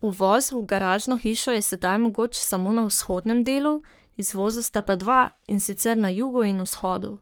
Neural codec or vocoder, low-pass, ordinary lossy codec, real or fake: codec, 44.1 kHz, 3.4 kbps, Pupu-Codec; none; none; fake